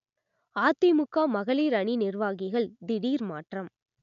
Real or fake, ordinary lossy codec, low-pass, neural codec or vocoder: real; none; 7.2 kHz; none